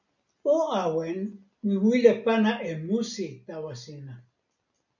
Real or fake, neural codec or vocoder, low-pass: real; none; 7.2 kHz